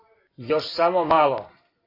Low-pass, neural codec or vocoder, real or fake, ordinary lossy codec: 5.4 kHz; none; real; AAC, 24 kbps